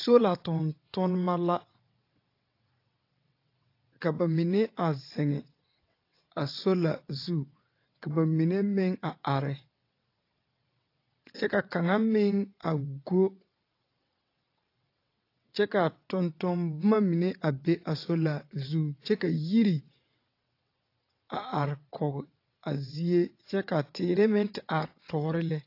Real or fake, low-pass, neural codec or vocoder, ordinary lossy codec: fake; 5.4 kHz; vocoder, 22.05 kHz, 80 mel bands, WaveNeXt; AAC, 32 kbps